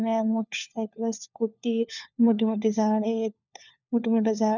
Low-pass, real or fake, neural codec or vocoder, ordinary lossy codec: 7.2 kHz; fake; codec, 16 kHz, 4 kbps, FunCodec, trained on LibriTTS, 50 frames a second; none